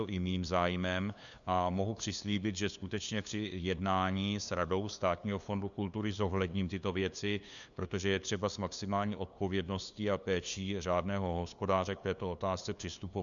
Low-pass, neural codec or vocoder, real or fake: 7.2 kHz; codec, 16 kHz, 2 kbps, FunCodec, trained on LibriTTS, 25 frames a second; fake